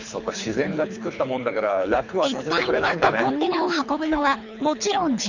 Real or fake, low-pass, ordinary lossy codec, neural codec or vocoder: fake; 7.2 kHz; none; codec, 24 kHz, 3 kbps, HILCodec